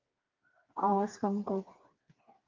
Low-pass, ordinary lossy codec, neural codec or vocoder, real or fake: 7.2 kHz; Opus, 32 kbps; codec, 16 kHz, 4 kbps, FreqCodec, smaller model; fake